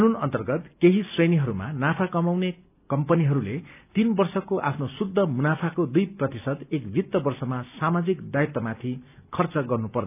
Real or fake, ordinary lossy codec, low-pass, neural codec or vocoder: real; none; 3.6 kHz; none